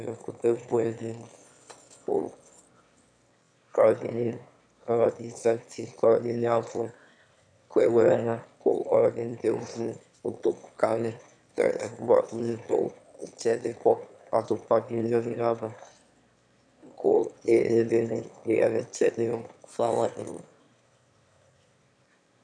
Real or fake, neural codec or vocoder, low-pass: fake; autoencoder, 22.05 kHz, a latent of 192 numbers a frame, VITS, trained on one speaker; 9.9 kHz